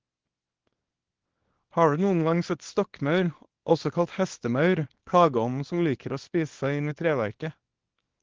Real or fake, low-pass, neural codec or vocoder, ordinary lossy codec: fake; 7.2 kHz; codec, 24 kHz, 0.9 kbps, WavTokenizer, small release; Opus, 16 kbps